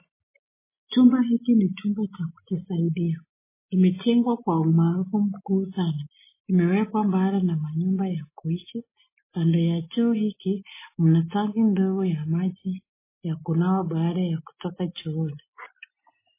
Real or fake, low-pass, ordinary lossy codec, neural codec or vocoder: real; 3.6 kHz; MP3, 16 kbps; none